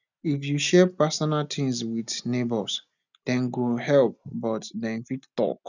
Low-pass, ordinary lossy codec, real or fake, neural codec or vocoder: 7.2 kHz; none; real; none